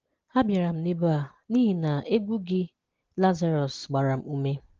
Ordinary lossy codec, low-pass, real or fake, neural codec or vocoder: Opus, 16 kbps; 7.2 kHz; real; none